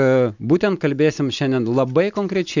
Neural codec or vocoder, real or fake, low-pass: none; real; 7.2 kHz